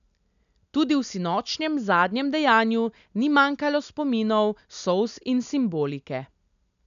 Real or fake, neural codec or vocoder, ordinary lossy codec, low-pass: real; none; none; 7.2 kHz